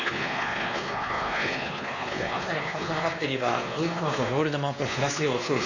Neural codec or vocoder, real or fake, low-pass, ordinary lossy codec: codec, 16 kHz, 2 kbps, X-Codec, WavLM features, trained on Multilingual LibriSpeech; fake; 7.2 kHz; AAC, 48 kbps